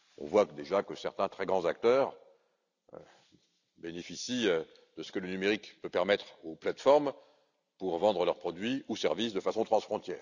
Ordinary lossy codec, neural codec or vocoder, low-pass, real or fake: none; none; 7.2 kHz; real